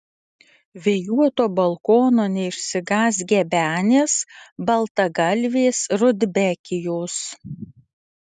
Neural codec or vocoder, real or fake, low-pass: none; real; 10.8 kHz